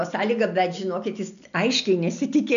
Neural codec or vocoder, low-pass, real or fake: none; 7.2 kHz; real